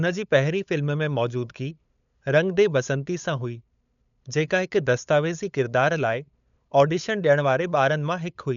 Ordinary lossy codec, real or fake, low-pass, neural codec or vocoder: none; fake; 7.2 kHz; codec, 16 kHz, 8 kbps, FunCodec, trained on LibriTTS, 25 frames a second